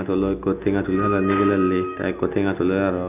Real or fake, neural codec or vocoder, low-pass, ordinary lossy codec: real; none; 3.6 kHz; none